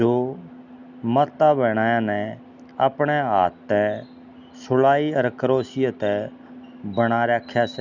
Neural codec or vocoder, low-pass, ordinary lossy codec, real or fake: none; 7.2 kHz; none; real